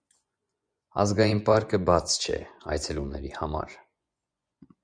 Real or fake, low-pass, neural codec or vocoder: fake; 9.9 kHz; vocoder, 24 kHz, 100 mel bands, Vocos